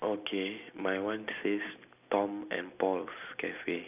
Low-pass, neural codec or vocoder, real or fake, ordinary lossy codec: 3.6 kHz; none; real; none